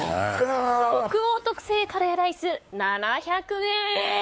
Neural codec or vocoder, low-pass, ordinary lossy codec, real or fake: codec, 16 kHz, 4 kbps, X-Codec, WavLM features, trained on Multilingual LibriSpeech; none; none; fake